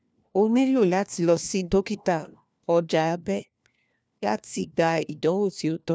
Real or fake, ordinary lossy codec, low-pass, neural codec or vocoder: fake; none; none; codec, 16 kHz, 1 kbps, FunCodec, trained on LibriTTS, 50 frames a second